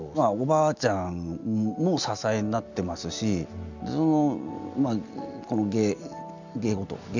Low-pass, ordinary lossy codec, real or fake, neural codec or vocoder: 7.2 kHz; none; real; none